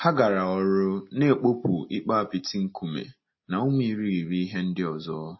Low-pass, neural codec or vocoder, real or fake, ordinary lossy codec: 7.2 kHz; none; real; MP3, 24 kbps